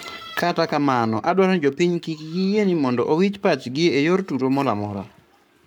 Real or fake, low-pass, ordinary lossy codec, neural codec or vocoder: fake; none; none; codec, 44.1 kHz, 7.8 kbps, Pupu-Codec